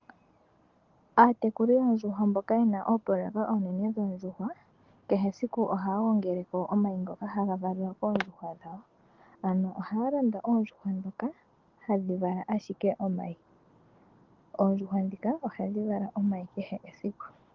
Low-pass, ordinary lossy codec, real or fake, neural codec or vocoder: 7.2 kHz; Opus, 16 kbps; real; none